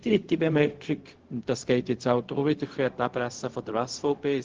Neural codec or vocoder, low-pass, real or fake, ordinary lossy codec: codec, 16 kHz, 0.4 kbps, LongCat-Audio-Codec; 7.2 kHz; fake; Opus, 16 kbps